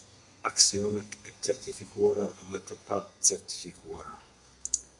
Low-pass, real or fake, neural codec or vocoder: 10.8 kHz; fake; codec, 32 kHz, 1.9 kbps, SNAC